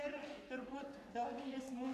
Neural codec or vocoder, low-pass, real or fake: codec, 44.1 kHz, 3.4 kbps, Pupu-Codec; 14.4 kHz; fake